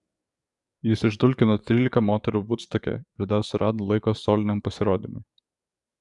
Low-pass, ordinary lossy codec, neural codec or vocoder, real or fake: 10.8 kHz; Opus, 64 kbps; codec, 44.1 kHz, 7.8 kbps, DAC; fake